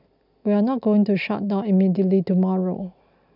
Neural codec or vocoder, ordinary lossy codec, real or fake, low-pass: none; none; real; 5.4 kHz